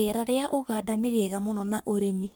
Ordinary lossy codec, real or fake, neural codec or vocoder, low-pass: none; fake; codec, 44.1 kHz, 3.4 kbps, Pupu-Codec; none